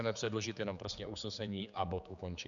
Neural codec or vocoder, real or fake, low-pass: codec, 16 kHz, 4 kbps, X-Codec, HuBERT features, trained on general audio; fake; 7.2 kHz